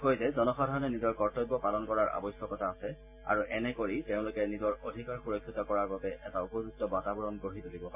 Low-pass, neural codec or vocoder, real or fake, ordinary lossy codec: 3.6 kHz; none; real; MP3, 24 kbps